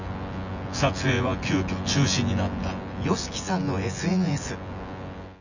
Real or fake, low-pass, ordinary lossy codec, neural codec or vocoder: fake; 7.2 kHz; none; vocoder, 24 kHz, 100 mel bands, Vocos